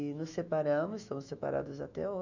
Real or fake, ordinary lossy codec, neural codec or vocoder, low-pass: fake; none; autoencoder, 48 kHz, 128 numbers a frame, DAC-VAE, trained on Japanese speech; 7.2 kHz